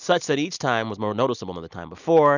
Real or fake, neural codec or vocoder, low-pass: real; none; 7.2 kHz